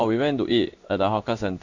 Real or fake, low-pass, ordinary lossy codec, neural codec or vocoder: fake; 7.2 kHz; Opus, 64 kbps; codec, 16 kHz in and 24 kHz out, 1 kbps, XY-Tokenizer